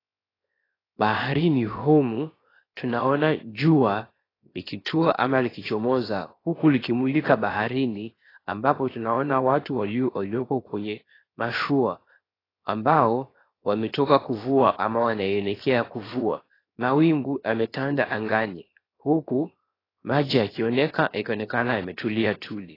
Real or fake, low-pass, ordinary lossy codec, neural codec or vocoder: fake; 5.4 kHz; AAC, 24 kbps; codec, 16 kHz, 0.7 kbps, FocalCodec